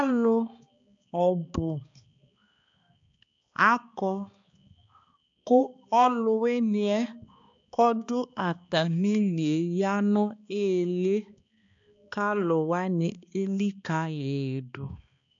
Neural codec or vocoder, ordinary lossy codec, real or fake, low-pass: codec, 16 kHz, 2 kbps, X-Codec, HuBERT features, trained on balanced general audio; AAC, 64 kbps; fake; 7.2 kHz